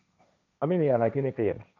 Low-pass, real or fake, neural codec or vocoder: 7.2 kHz; fake; codec, 16 kHz, 1.1 kbps, Voila-Tokenizer